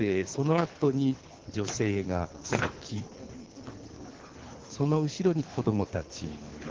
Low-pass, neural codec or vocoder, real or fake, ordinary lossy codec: 7.2 kHz; codec, 24 kHz, 3 kbps, HILCodec; fake; Opus, 16 kbps